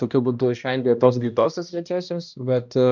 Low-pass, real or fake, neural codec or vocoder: 7.2 kHz; fake; codec, 16 kHz, 1 kbps, X-Codec, HuBERT features, trained on balanced general audio